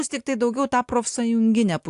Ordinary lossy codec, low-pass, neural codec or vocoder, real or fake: AAC, 64 kbps; 10.8 kHz; none; real